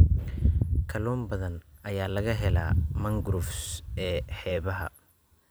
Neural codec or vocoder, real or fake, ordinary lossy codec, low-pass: vocoder, 44.1 kHz, 128 mel bands every 256 samples, BigVGAN v2; fake; none; none